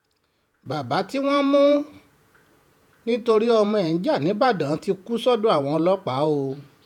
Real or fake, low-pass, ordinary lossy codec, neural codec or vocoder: fake; 19.8 kHz; none; vocoder, 48 kHz, 128 mel bands, Vocos